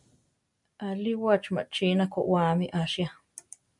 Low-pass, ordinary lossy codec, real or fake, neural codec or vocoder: 10.8 kHz; MP3, 64 kbps; real; none